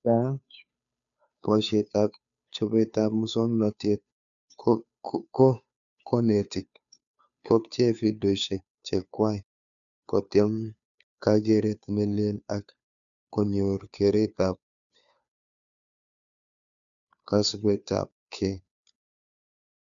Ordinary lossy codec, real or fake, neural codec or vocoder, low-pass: none; fake; codec, 16 kHz, 2 kbps, FunCodec, trained on Chinese and English, 25 frames a second; 7.2 kHz